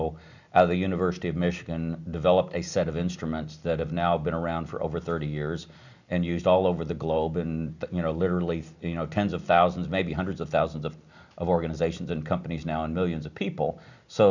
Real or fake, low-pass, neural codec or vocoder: real; 7.2 kHz; none